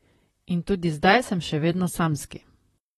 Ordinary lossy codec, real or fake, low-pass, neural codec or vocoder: AAC, 32 kbps; fake; 19.8 kHz; vocoder, 44.1 kHz, 128 mel bands, Pupu-Vocoder